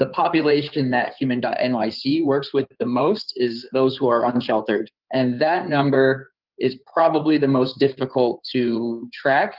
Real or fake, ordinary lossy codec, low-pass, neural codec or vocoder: fake; Opus, 32 kbps; 5.4 kHz; codec, 16 kHz in and 24 kHz out, 2.2 kbps, FireRedTTS-2 codec